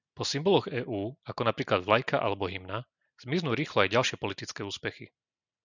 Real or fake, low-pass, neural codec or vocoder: real; 7.2 kHz; none